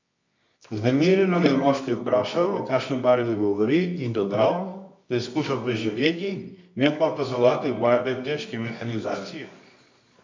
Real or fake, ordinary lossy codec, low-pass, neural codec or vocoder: fake; MP3, 64 kbps; 7.2 kHz; codec, 24 kHz, 0.9 kbps, WavTokenizer, medium music audio release